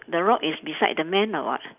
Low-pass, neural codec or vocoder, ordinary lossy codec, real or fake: 3.6 kHz; none; none; real